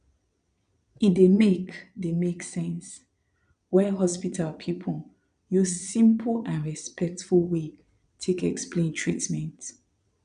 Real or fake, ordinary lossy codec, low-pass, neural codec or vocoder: fake; none; 9.9 kHz; vocoder, 22.05 kHz, 80 mel bands, Vocos